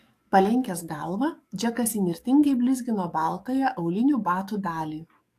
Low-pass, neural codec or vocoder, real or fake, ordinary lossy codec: 14.4 kHz; codec, 44.1 kHz, 7.8 kbps, DAC; fake; AAC, 64 kbps